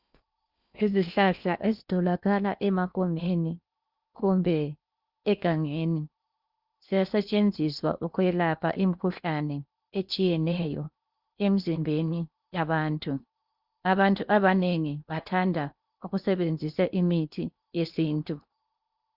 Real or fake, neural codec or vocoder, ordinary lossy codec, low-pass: fake; codec, 16 kHz in and 24 kHz out, 0.8 kbps, FocalCodec, streaming, 65536 codes; Opus, 64 kbps; 5.4 kHz